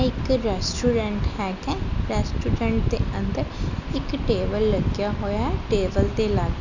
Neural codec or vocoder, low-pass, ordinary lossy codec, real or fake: none; 7.2 kHz; none; real